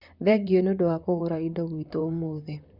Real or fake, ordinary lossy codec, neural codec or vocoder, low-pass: fake; Opus, 64 kbps; vocoder, 44.1 kHz, 128 mel bands, Pupu-Vocoder; 5.4 kHz